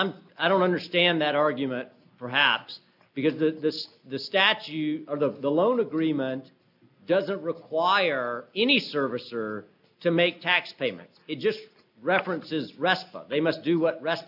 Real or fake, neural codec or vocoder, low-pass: real; none; 5.4 kHz